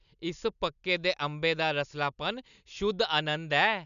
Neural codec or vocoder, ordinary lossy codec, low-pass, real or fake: none; none; 7.2 kHz; real